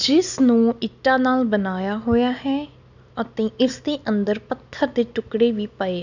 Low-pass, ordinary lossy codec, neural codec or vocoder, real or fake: 7.2 kHz; none; none; real